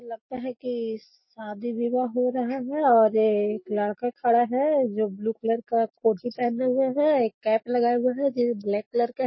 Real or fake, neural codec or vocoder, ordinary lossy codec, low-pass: real; none; MP3, 24 kbps; 7.2 kHz